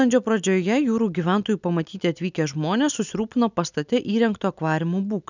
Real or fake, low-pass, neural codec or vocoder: real; 7.2 kHz; none